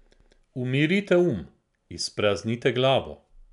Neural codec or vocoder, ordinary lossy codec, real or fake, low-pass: none; none; real; 10.8 kHz